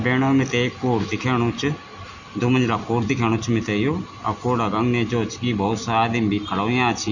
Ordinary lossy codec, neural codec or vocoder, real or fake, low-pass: none; none; real; 7.2 kHz